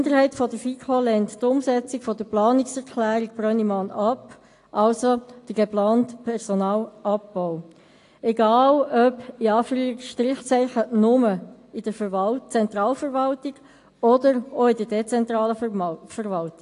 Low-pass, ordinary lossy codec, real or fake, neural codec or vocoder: 10.8 kHz; AAC, 48 kbps; real; none